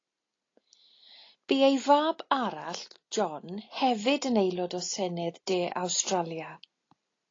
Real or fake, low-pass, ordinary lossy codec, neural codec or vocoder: real; 7.2 kHz; AAC, 32 kbps; none